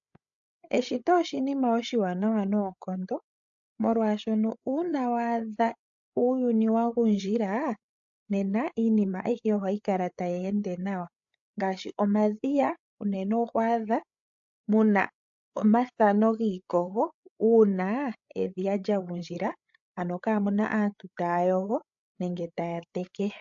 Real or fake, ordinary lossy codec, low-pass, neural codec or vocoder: fake; AAC, 64 kbps; 7.2 kHz; codec, 16 kHz, 8 kbps, FreqCodec, larger model